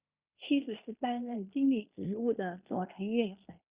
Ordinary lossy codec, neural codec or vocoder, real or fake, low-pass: Opus, 64 kbps; codec, 16 kHz in and 24 kHz out, 0.9 kbps, LongCat-Audio-Codec, fine tuned four codebook decoder; fake; 3.6 kHz